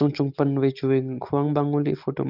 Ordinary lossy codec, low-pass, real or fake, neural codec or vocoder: Opus, 32 kbps; 5.4 kHz; real; none